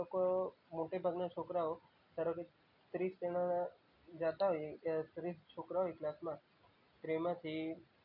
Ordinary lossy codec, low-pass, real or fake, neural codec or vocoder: none; 5.4 kHz; real; none